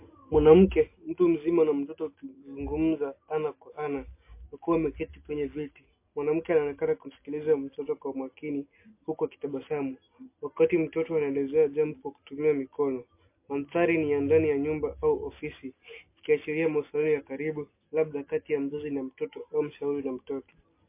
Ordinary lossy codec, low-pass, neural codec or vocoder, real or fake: MP3, 24 kbps; 3.6 kHz; none; real